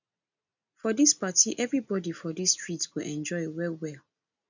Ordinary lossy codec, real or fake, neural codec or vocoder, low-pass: none; real; none; 7.2 kHz